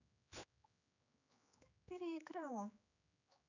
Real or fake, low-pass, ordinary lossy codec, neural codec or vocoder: fake; 7.2 kHz; none; codec, 16 kHz, 4 kbps, X-Codec, HuBERT features, trained on general audio